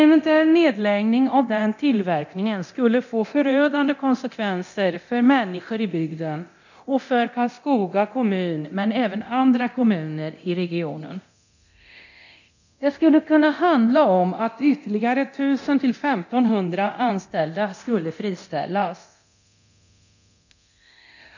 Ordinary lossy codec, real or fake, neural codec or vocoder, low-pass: none; fake; codec, 24 kHz, 0.9 kbps, DualCodec; 7.2 kHz